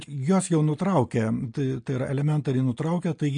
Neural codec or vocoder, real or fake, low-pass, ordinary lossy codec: none; real; 9.9 kHz; MP3, 48 kbps